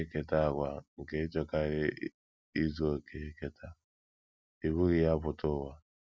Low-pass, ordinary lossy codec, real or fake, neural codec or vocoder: none; none; real; none